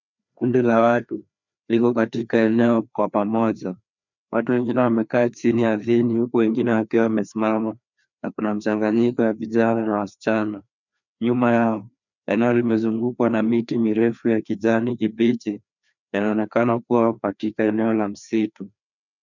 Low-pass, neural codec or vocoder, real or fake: 7.2 kHz; codec, 16 kHz, 2 kbps, FreqCodec, larger model; fake